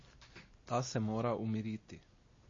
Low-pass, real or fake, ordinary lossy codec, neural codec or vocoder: 7.2 kHz; real; MP3, 32 kbps; none